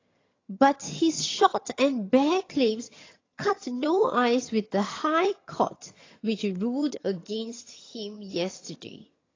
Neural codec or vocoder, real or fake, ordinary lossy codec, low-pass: vocoder, 22.05 kHz, 80 mel bands, HiFi-GAN; fake; AAC, 32 kbps; 7.2 kHz